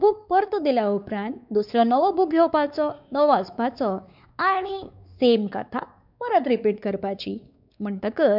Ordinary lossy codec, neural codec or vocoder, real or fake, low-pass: none; codec, 16 kHz, 4 kbps, X-Codec, WavLM features, trained on Multilingual LibriSpeech; fake; 5.4 kHz